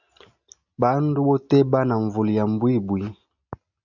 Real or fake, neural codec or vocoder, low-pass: real; none; 7.2 kHz